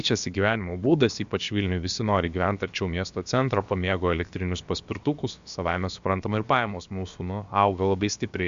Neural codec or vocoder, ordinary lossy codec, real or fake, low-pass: codec, 16 kHz, about 1 kbps, DyCAST, with the encoder's durations; MP3, 64 kbps; fake; 7.2 kHz